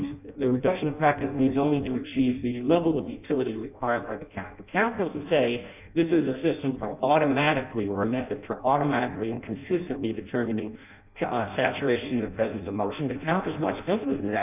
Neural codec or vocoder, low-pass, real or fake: codec, 16 kHz in and 24 kHz out, 0.6 kbps, FireRedTTS-2 codec; 3.6 kHz; fake